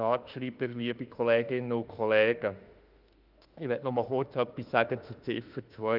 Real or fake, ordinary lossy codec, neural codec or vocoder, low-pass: fake; Opus, 24 kbps; autoencoder, 48 kHz, 32 numbers a frame, DAC-VAE, trained on Japanese speech; 5.4 kHz